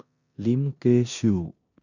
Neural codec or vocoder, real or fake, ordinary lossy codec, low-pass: codec, 16 kHz in and 24 kHz out, 0.9 kbps, LongCat-Audio-Codec, four codebook decoder; fake; none; 7.2 kHz